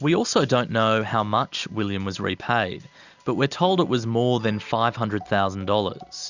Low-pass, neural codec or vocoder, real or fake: 7.2 kHz; none; real